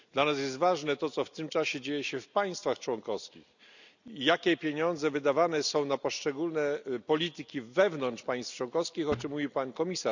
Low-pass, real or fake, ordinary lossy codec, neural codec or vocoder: 7.2 kHz; real; none; none